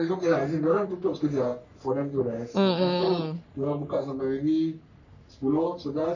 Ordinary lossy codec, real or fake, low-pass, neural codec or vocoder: none; fake; 7.2 kHz; codec, 44.1 kHz, 3.4 kbps, Pupu-Codec